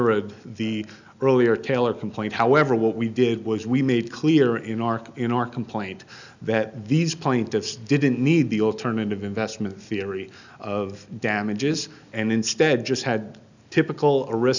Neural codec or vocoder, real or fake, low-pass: none; real; 7.2 kHz